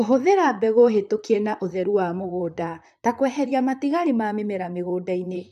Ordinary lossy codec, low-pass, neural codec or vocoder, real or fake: AAC, 96 kbps; 14.4 kHz; vocoder, 44.1 kHz, 128 mel bands, Pupu-Vocoder; fake